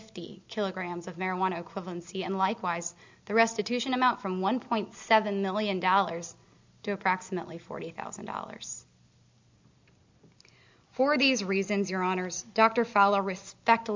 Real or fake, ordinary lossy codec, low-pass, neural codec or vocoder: real; MP3, 64 kbps; 7.2 kHz; none